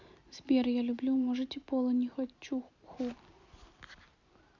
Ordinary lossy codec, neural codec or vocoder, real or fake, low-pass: none; none; real; 7.2 kHz